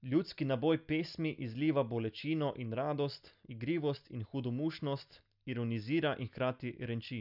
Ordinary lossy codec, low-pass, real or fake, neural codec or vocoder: none; 5.4 kHz; real; none